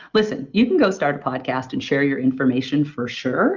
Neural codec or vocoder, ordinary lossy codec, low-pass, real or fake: none; Opus, 32 kbps; 7.2 kHz; real